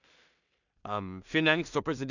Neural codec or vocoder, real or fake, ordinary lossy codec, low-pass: codec, 16 kHz in and 24 kHz out, 0.4 kbps, LongCat-Audio-Codec, two codebook decoder; fake; none; 7.2 kHz